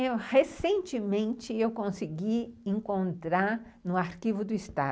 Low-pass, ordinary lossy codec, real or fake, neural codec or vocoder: none; none; real; none